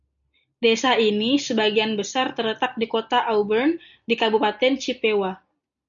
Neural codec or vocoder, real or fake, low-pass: none; real; 7.2 kHz